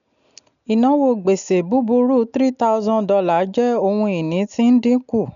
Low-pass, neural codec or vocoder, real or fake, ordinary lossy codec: 7.2 kHz; none; real; none